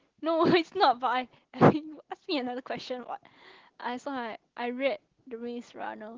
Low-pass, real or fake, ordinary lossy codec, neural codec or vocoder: 7.2 kHz; real; Opus, 16 kbps; none